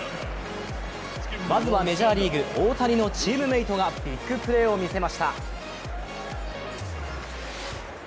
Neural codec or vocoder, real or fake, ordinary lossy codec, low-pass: none; real; none; none